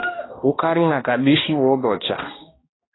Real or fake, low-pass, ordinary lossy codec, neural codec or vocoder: fake; 7.2 kHz; AAC, 16 kbps; codec, 16 kHz, 1 kbps, X-Codec, HuBERT features, trained on balanced general audio